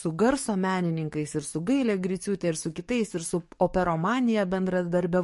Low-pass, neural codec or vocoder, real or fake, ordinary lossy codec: 14.4 kHz; codec, 44.1 kHz, 7.8 kbps, DAC; fake; MP3, 48 kbps